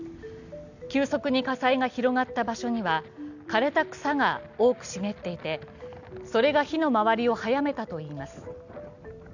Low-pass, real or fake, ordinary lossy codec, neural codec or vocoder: 7.2 kHz; real; none; none